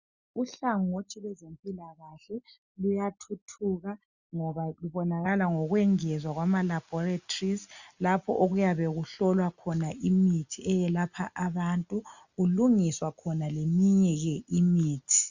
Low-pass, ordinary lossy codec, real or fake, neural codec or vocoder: 7.2 kHz; Opus, 64 kbps; real; none